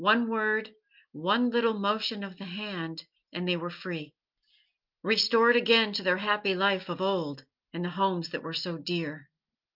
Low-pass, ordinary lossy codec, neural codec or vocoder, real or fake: 5.4 kHz; Opus, 24 kbps; none; real